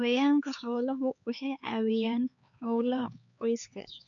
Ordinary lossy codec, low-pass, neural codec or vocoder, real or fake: none; 7.2 kHz; codec, 16 kHz, 4 kbps, X-Codec, HuBERT features, trained on LibriSpeech; fake